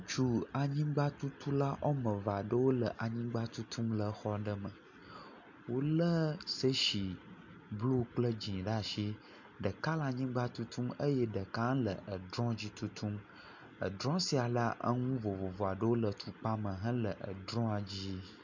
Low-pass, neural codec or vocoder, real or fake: 7.2 kHz; none; real